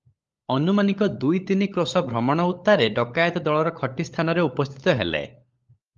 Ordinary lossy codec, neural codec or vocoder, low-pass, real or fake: Opus, 32 kbps; codec, 16 kHz, 16 kbps, FunCodec, trained on LibriTTS, 50 frames a second; 7.2 kHz; fake